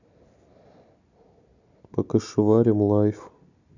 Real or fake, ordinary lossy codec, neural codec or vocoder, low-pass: real; none; none; 7.2 kHz